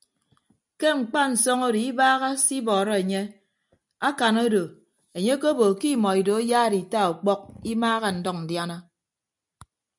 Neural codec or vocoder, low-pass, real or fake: none; 10.8 kHz; real